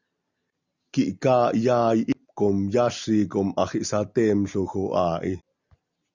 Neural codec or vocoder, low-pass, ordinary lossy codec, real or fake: none; 7.2 kHz; Opus, 64 kbps; real